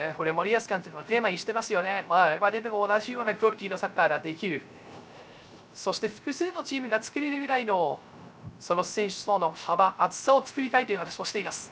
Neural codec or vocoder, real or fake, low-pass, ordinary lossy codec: codec, 16 kHz, 0.3 kbps, FocalCodec; fake; none; none